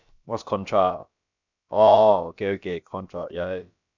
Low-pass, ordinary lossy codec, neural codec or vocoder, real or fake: 7.2 kHz; none; codec, 16 kHz, about 1 kbps, DyCAST, with the encoder's durations; fake